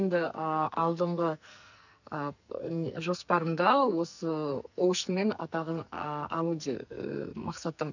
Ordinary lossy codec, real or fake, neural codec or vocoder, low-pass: none; fake; codec, 44.1 kHz, 2.6 kbps, SNAC; 7.2 kHz